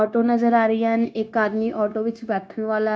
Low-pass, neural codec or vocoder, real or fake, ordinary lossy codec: none; codec, 16 kHz, 0.9 kbps, LongCat-Audio-Codec; fake; none